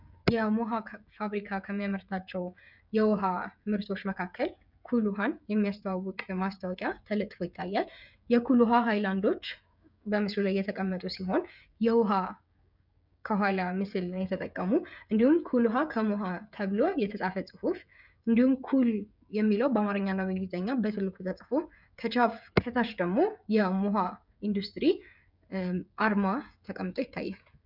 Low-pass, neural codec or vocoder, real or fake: 5.4 kHz; codec, 16 kHz, 16 kbps, FreqCodec, smaller model; fake